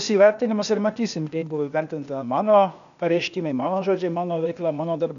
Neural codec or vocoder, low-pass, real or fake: codec, 16 kHz, 0.8 kbps, ZipCodec; 7.2 kHz; fake